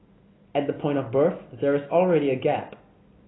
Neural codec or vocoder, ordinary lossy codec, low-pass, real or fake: none; AAC, 16 kbps; 7.2 kHz; real